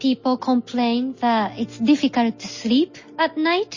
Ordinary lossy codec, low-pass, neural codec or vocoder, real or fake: MP3, 32 kbps; 7.2 kHz; none; real